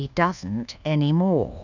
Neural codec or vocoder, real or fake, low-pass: codec, 24 kHz, 1.2 kbps, DualCodec; fake; 7.2 kHz